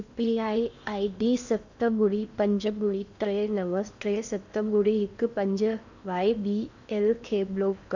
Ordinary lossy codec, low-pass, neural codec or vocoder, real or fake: none; 7.2 kHz; codec, 16 kHz in and 24 kHz out, 0.8 kbps, FocalCodec, streaming, 65536 codes; fake